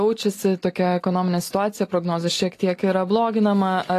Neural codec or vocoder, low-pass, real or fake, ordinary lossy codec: none; 14.4 kHz; real; AAC, 48 kbps